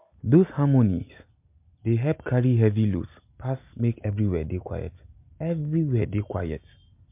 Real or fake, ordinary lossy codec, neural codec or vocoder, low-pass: real; MP3, 32 kbps; none; 3.6 kHz